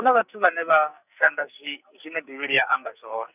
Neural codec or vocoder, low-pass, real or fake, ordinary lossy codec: codec, 44.1 kHz, 2.6 kbps, SNAC; 3.6 kHz; fake; none